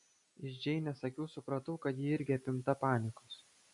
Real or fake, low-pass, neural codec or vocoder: real; 10.8 kHz; none